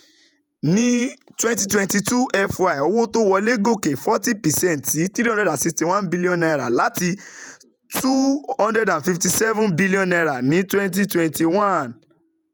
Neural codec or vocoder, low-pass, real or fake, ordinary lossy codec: vocoder, 48 kHz, 128 mel bands, Vocos; none; fake; none